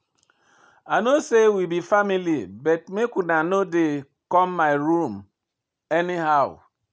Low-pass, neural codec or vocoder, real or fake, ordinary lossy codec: none; none; real; none